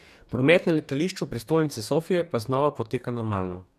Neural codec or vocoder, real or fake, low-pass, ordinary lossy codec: codec, 44.1 kHz, 2.6 kbps, DAC; fake; 14.4 kHz; none